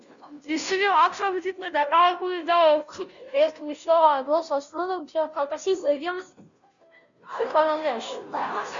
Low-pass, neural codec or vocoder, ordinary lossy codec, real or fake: 7.2 kHz; codec, 16 kHz, 0.5 kbps, FunCodec, trained on Chinese and English, 25 frames a second; MP3, 96 kbps; fake